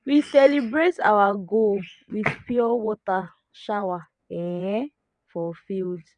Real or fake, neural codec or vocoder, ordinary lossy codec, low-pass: fake; vocoder, 22.05 kHz, 80 mel bands, WaveNeXt; none; 9.9 kHz